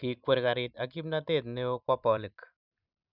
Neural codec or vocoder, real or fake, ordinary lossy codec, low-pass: vocoder, 44.1 kHz, 128 mel bands every 256 samples, BigVGAN v2; fake; none; 5.4 kHz